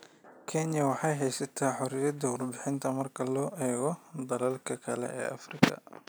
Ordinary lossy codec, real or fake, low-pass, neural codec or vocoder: none; real; none; none